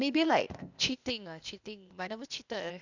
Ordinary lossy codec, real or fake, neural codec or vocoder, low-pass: none; fake; codec, 16 kHz, 0.8 kbps, ZipCodec; 7.2 kHz